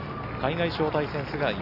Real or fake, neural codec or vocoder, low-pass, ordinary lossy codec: real; none; 5.4 kHz; none